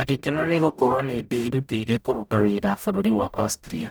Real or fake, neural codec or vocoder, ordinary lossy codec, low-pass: fake; codec, 44.1 kHz, 0.9 kbps, DAC; none; none